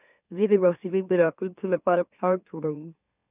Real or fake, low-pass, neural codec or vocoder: fake; 3.6 kHz; autoencoder, 44.1 kHz, a latent of 192 numbers a frame, MeloTTS